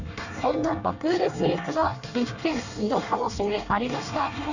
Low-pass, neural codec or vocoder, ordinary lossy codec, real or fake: 7.2 kHz; codec, 24 kHz, 1 kbps, SNAC; none; fake